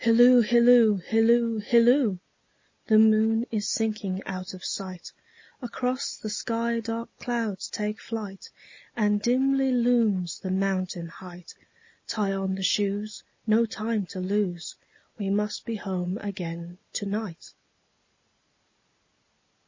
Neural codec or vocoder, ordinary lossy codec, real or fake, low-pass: none; MP3, 32 kbps; real; 7.2 kHz